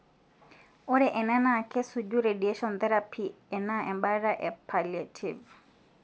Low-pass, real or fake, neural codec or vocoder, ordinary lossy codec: none; real; none; none